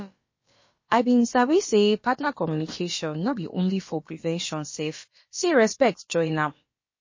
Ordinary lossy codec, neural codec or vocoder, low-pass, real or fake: MP3, 32 kbps; codec, 16 kHz, about 1 kbps, DyCAST, with the encoder's durations; 7.2 kHz; fake